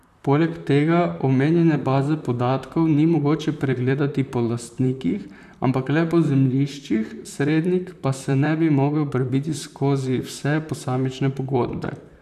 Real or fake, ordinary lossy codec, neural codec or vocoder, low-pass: fake; none; vocoder, 44.1 kHz, 128 mel bands, Pupu-Vocoder; 14.4 kHz